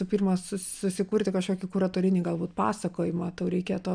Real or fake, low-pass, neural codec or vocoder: real; 9.9 kHz; none